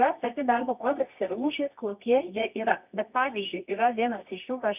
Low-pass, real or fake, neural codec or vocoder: 3.6 kHz; fake; codec, 24 kHz, 0.9 kbps, WavTokenizer, medium music audio release